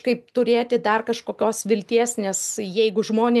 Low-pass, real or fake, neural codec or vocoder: 14.4 kHz; real; none